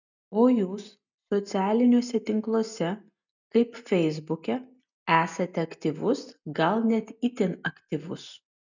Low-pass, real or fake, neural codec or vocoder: 7.2 kHz; real; none